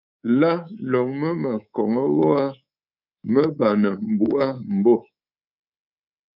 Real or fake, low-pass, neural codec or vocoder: fake; 5.4 kHz; codec, 24 kHz, 3.1 kbps, DualCodec